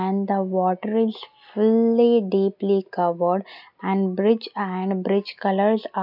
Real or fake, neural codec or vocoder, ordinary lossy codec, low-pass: real; none; none; 5.4 kHz